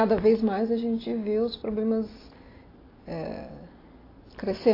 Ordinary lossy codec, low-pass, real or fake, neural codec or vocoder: AAC, 24 kbps; 5.4 kHz; real; none